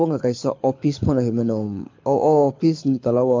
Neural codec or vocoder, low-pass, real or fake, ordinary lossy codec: codec, 24 kHz, 6 kbps, HILCodec; 7.2 kHz; fake; MP3, 48 kbps